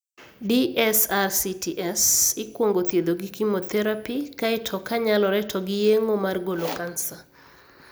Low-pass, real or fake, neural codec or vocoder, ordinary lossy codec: none; real; none; none